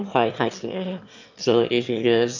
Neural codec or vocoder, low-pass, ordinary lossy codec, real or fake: autoencoder, 22.05 kHz, a latent of 192 numbers a frame, VITS, trained on one speaker; 7.2 kHz; none; fake